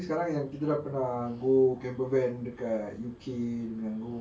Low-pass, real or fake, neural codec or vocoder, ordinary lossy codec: none; real; none; none